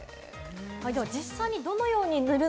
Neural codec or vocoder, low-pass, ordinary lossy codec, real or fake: none; none; none; real